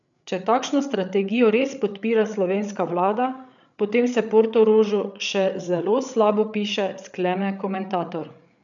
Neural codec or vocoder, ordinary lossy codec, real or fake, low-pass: codec, 16 kHz, 8 kbps, FreqCodec, larger model; none; fake; 7.2 kHz